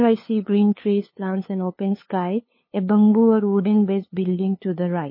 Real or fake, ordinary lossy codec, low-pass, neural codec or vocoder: fake; MP3, 24 kbps; 5.4 kHz; codec, 16 kHz, 2 kbps, FunCodec, trained on LibriTTS, 25 frames a second